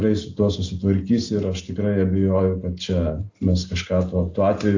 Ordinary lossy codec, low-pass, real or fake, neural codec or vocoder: AAC, 48 kbps; 7.2 kHz; real; none